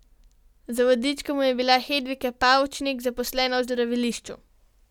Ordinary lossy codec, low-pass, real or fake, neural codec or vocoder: none; 19.8 kHz; real; none